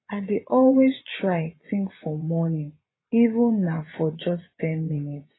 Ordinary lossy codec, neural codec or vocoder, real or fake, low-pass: AAC, 16 kbps; vocoder, 24 kHz, 100 mel bands, Vocos; fake; 7.2 kHz